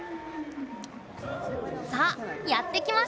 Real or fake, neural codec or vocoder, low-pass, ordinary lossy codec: real; none; none; none